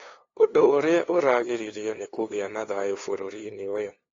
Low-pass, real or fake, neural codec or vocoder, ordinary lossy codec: 7.2 kHz; fake; codec, 16 kHz, 2 kbps, FunCodec, trained on LibriTTS, 25 frames a second; AAC, 32 kbps